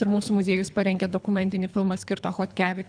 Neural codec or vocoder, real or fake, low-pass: codec, 24 kHz, 3 kbps, HILCodec; fake; 9.9 kHz